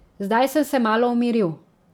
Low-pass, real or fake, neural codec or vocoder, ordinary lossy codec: none; real; none; none